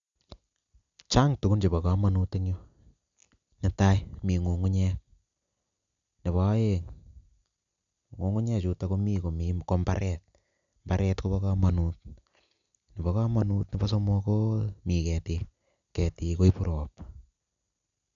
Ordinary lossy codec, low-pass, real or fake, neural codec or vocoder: none; 7.2 kHz; real; none